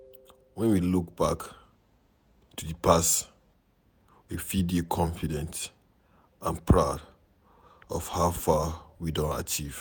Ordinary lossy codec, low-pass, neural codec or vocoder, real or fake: none; none; vocoder, 48 kHz, 128 mel bands, Vocos; fake